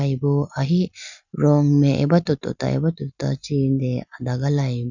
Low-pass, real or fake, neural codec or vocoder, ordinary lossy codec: 7.2 kHz; real; none; none